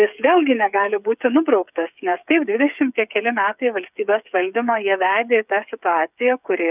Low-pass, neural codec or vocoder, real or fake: 3.6 kHz; codec, 16 kHz, 8 kbps, FreqCodec, smaller model; fake